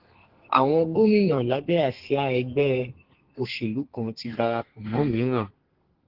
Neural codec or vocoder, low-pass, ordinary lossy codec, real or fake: codec, 32 kHz, 1.9 kbps, SNAC; 5.4 kHz; Opus, 16 kbps; fake